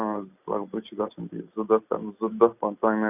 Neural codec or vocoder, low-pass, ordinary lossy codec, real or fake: none; 3.6 kHz; none; real